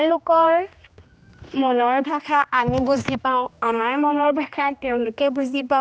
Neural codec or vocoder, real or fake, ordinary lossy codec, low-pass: codec, 16 kHz, 1 kbps, X-Codec, HuBERT features, trained on general audio; fake; none; none